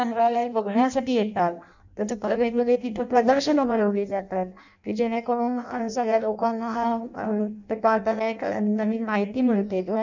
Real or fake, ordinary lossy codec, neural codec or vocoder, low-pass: fake; none; codec, 16 kHz in and 24 kHz out, 0.6 kbps, FireRedTTS-2 codec; 7.2 kHz